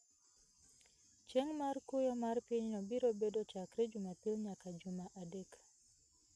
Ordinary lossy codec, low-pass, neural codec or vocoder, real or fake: none; none; none; real